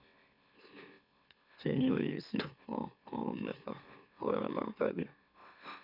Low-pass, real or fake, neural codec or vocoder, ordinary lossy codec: 5.4 kHz; fake; autoencoder, 44.1 kHz, a latent of 192 numbers a frame, MeloTTS; none